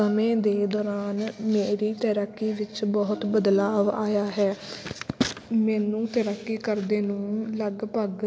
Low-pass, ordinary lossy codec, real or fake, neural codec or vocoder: none; none; real; none